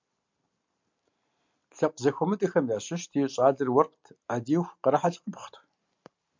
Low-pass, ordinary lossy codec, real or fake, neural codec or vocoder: 7.2 kHz; MP3, 64 kbps; real; none